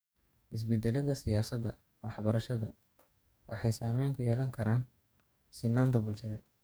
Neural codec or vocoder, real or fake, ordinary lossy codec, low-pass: codec, 44.1 kHz, 2.6 kbps, DAC; fake; none; none